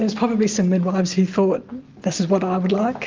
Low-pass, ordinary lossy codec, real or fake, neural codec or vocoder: 7.2 kHz; Opus, 32 kbps; real; none